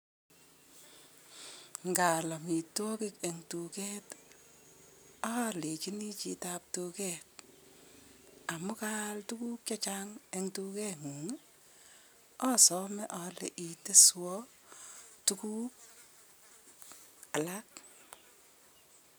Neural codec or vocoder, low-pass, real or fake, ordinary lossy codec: none; none; real; none